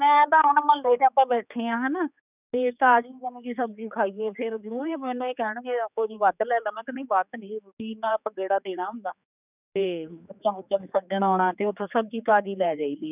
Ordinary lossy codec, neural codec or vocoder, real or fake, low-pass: none; codec, 16 kHz, 4 kbps, X-Codec, HuBERT features, trained on general audio; fake; 3.6 kHz